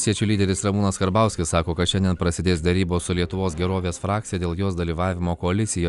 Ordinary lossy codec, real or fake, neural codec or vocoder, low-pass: Opus, 64 kbps; real; none; 10.8 kHz